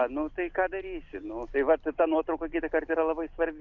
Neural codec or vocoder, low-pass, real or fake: none; 7.2 kHz; real